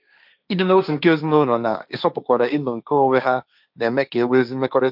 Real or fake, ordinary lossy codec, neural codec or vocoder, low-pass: fake; none; codec, 16 kHz, 1.1 kbps, Voila-Tokenizer; 5.4 kHz